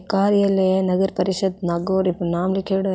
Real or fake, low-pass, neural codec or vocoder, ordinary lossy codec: real; none; none; none